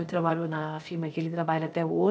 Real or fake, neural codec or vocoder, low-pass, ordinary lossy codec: fake; codec, 16 kHz, 0.8 kbps, ZipCodec; none; none